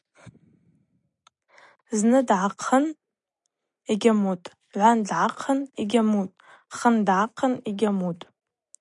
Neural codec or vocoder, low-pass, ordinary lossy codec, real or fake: none; 10.8 kHz; MP3, 96 kbps; real